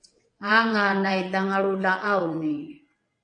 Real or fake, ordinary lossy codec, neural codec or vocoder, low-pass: fake; MP3, 48 kbps; vocoder, 22.05 kHz, 80 mel bands, WaveNeXt; 9.9 kHz